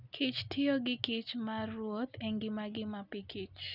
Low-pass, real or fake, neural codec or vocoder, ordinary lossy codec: 5.4 kHz; real; none; none